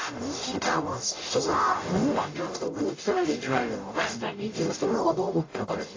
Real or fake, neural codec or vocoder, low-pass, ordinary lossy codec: fake; codec, 44.1 kHz, 0.9 kbps, DAC; 7.2 kHz; AAC, 32 kbps